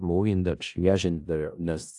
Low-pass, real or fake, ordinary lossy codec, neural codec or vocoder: 10.8 kHz; fake; AAC, 64 kbps; codec, 16 kHz in and 24 kHz out, 0.4 kbps, LongCat-Audio-Codec, four codebook decoder